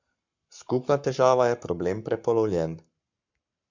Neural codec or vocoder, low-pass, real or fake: codec, 44.1 kHz, 7.8 kbps, Pupu-Codec; 7.2 kHz; fake